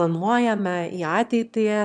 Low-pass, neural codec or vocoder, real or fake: 9.9 kHz; autoencoder, 22.05 kHz, a latent of 192 numbers a frame, VITS, trained on one speaker; fake